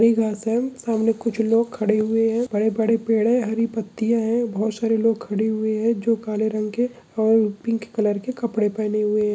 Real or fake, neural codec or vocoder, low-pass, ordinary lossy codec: real; none; none; none